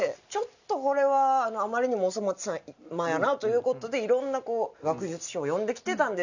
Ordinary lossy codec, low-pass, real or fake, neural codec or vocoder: none; 7.2 kHz; real; none